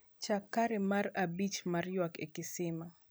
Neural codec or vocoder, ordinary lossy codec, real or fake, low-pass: vocoder, 44.1 kHz, 128 mel bands every 256 samples, BigVGAN v2; none; fake; none